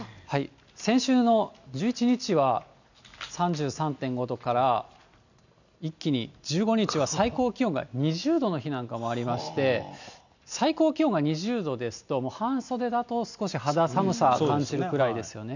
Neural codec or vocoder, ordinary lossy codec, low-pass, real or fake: none; none; 7.2 kHz; real